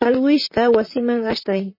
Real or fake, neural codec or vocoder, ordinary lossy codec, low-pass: fake; vocoder, 44.1 kHz, 128 mel bands, Pupu-Vocoder; MP3, 24 kbps; 5.4 kHz